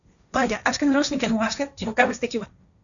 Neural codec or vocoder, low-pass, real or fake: codec, 16 kHz, 1.1 kbps, Voila-Tokenizer; 7.2 kHz; fake